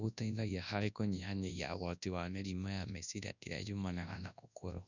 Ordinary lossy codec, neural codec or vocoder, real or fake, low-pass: none; codec, 24 kHz, 0.9 kbps, WavTokenizer, large speech release; fake; 7.2 kHz